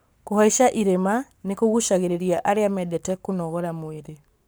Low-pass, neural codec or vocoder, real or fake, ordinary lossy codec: none; codec, 44.1 kHz, 7.8 kbps, Pupu-Codec; fake; none